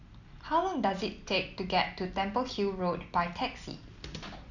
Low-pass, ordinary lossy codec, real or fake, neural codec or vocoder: 7.2 kHz; none; real; none